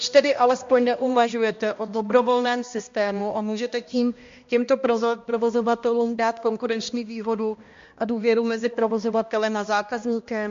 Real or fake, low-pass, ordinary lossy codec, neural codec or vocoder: fake; 7.2 kHz; MP3, 48 kbps; codec, 16 kHz, 1 kbps, X-Codec, HuBERT features, trained on balanced general audio